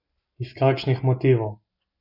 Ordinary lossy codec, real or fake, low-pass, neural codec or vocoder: none; real; 5.4 kHz; none